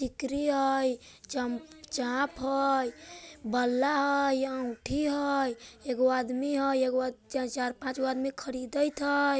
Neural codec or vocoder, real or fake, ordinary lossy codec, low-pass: none; real; none; none